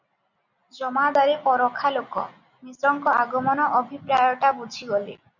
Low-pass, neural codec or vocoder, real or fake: 7.2 kHz; none; real